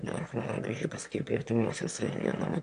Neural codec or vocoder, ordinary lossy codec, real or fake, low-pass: autoencoder, 22.05 kHz, a latent of 192 numbers a frame, VITS, trained on one speaker; MP3, 64 kbps; fake; 9.9 kHz